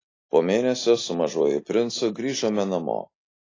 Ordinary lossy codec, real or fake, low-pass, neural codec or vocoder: AAC, 32 kbps; real; 7.2 kHz; none